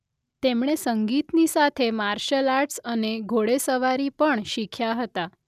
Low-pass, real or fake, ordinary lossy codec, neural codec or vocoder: 14.4 kHz; real; none; none